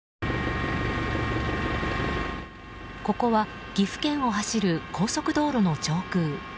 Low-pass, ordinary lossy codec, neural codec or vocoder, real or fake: none; none; none; real